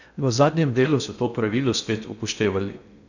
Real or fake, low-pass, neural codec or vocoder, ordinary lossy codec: fake; 7.2 kHz; codec, 16 kHz in and 24 kHz out, 0.6 kbps, FocalCodec, streaming, 2048 codes; none